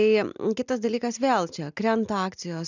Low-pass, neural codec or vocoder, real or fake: 7.2 kHz; none; real